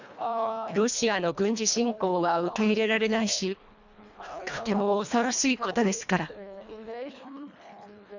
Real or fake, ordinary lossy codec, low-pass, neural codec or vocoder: fake; none; 7.2 kHz; codec, 24 kHz, 1.5 kbps, HILCodec